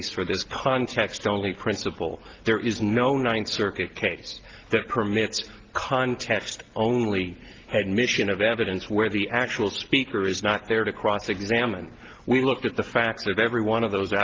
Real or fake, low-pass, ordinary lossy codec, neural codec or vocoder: real; 7.2 kHz; Opus, 16 kbps; none